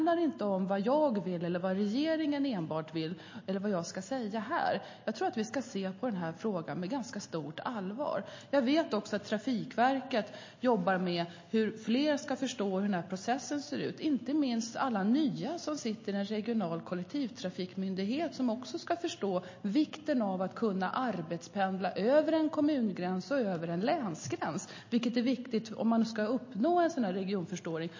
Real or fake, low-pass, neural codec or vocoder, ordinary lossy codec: real; 7.2 kHz; none; MP3, 32 kbps